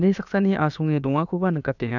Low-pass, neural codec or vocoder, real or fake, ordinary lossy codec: 7.2 kHz; codec, 16 kHz, about 1 kbps, DyCAST, with the encoder's durations; fake; none